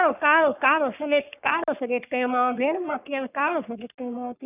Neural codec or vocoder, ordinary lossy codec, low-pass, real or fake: codec, 44.1 kHz, 3.4 kbps, Pupu-Codec; none; 3.6 kHz; fake